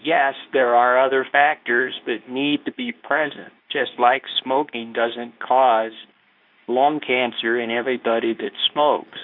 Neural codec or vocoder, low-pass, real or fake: codec, 24 kHz, 0.9 kbps, WavTokenizer, medium speech release version 2; 5.4 kHz; fake